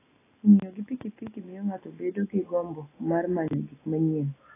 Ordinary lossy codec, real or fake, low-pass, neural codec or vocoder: AAC, 16 kbps; real; 3.6 kHz; none